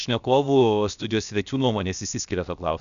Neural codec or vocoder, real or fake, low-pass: codec, 16 kHz, 0.7 kbps, FocalCodec; fake; 7.2 kHz